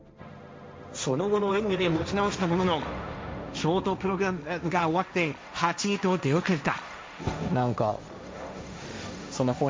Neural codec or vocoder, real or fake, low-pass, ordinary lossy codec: codec, 16 kHz, 1.1 kbps, Voila-Tokenizer; fake; none; none